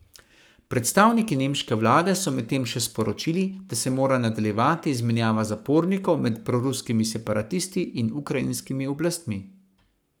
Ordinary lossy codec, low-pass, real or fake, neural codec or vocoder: none; none; fake; codec, 44.1 kHz, 7.8 kbps, Pupu-Codec